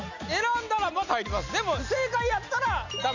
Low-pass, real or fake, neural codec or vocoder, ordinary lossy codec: 7.2 kHz; real; none; none